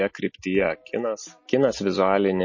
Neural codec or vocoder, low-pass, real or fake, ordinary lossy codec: none; 7.2 kHz; real; MP3, 32 kbps